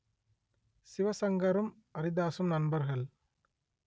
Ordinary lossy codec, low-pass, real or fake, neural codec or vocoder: none; none; real; none